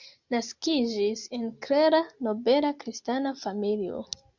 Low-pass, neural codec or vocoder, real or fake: 7.2 kHz; none; real